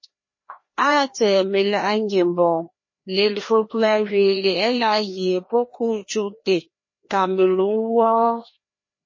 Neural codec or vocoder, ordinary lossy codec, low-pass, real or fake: codec, 16 kHz, 1 kbps, FreqCodec, larger model; MP3, 32 kbps; 7.2 kHz; fake